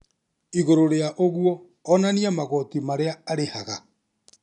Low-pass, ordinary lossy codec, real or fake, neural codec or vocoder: 10.8 kHz; none; real; none